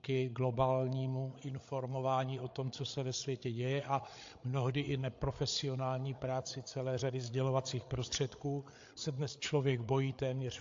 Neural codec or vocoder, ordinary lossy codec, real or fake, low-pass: codec, 16 kHz, 8 kbps, FreqCodec, larger model; AAC, 48 kbps; fake; 7.2 kHz